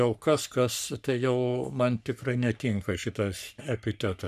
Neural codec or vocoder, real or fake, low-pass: codec, 44.1 kHz, 3.4 kbps, Pupu-Codec; fake; 14.4 kHz